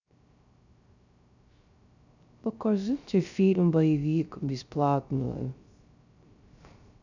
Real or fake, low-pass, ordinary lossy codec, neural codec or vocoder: fake; 7.2 kHz; none; codec, 16 kHz, 0.3 kbps, FocalCodec